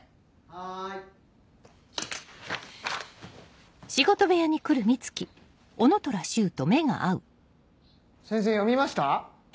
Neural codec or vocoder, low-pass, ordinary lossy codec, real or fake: none; none; none; real